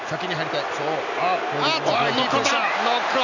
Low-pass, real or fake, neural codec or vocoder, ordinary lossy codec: 7.2 kHz; real; none; none